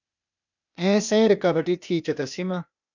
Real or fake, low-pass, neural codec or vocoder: fake; 7.2 kHz; codec, 16 kHz, 0.8 kbps, ZipCodec